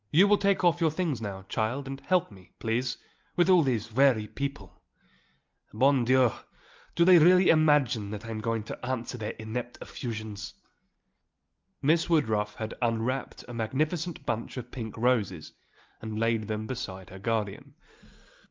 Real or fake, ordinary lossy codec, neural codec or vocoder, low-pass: real; Opus, 32 kbps; none; 7.2 kHz